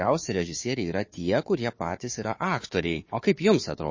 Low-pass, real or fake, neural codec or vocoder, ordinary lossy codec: 7.2 kHz; fake; codec, 16 kHz, 4 kbps, FunCodec, trained on Chinese and English, 50 frames a second; MP3, 32 kbps